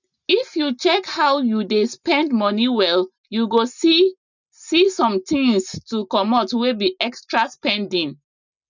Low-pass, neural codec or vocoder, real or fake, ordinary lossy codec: 7.2 kHz; none; real; none